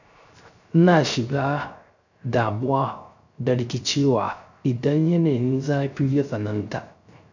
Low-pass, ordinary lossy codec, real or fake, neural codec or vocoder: 7.2 kHz; none; fake; codec, 16 kHz, 0.3 kbps, FocalCodec